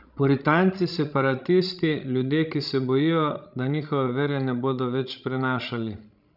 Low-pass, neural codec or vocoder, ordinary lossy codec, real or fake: 5.4 kHz; codec, 16 kHz, 16 kbps, FreqCodec, larger model; none; fake